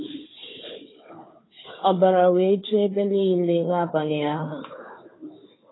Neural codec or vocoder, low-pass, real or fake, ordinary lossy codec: codec, 16 kHz, 4 kbps, FunCodec, trained on Chinese and English, 50 frames a second; 7.2 kHz; fake; AAC, 16 kbps